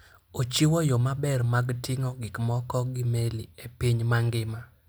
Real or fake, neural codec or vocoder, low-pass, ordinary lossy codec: real; none; none; none